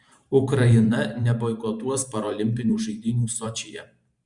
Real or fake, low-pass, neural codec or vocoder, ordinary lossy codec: fake; 10.8 kHz; vocoder, 44.1 kHz, 128 mel bands every 512 samples, BigVGAN v2; Opus, 64 kbps